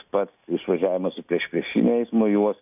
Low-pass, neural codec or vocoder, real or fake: 3.6 kHz; none; real